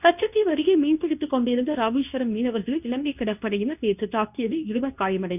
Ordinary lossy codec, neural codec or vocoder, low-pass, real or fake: none; codec, 24 kHz, 0.9 kbps, WavTokenizer, medium speech release version 2; 3.6 kHz; fake